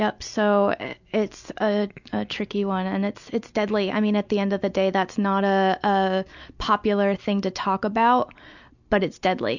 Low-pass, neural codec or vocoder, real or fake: 7.2 kHz; none; real